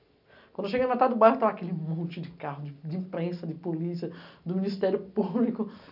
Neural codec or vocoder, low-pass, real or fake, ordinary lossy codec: none; 5.4 kHz; real; none